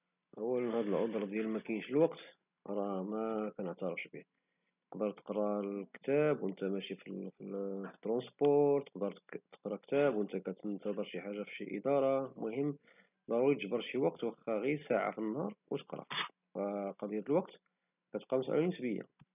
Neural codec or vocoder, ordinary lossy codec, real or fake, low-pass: none; none; real; 3.6 kHz